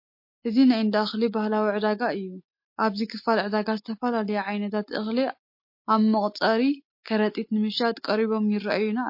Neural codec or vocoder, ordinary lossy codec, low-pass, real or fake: none; MP3, 32 kbps; 5.4 kHz; real